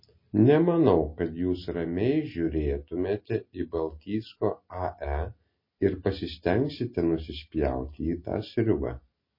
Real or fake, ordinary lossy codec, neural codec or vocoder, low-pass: real; MP3, 24 kbps; none; 5.4 kHz